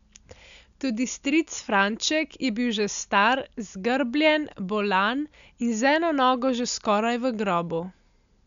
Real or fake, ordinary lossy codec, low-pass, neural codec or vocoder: real; none; 7.2 kHz; none